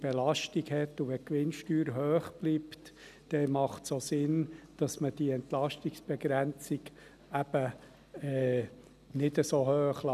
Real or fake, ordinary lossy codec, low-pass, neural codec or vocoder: real; none; 14.4 kHz; none